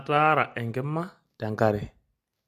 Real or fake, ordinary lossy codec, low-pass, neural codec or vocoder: real; MP3, 64 kbps; 14.4 kHz; none